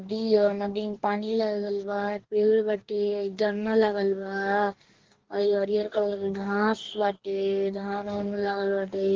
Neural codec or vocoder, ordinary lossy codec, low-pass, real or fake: codec, 44.1 kHz, 2.6 kbps, DAC; Opus, 16 kbps; 7.2 kHz; fake